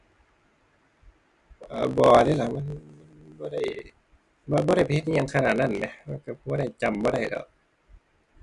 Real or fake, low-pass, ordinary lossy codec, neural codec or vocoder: real; 10.8 kHz; none; none